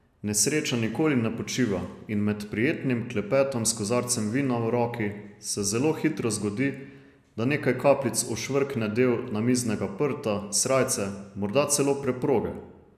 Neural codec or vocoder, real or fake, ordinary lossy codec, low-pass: none; real; none; 14.4 kHz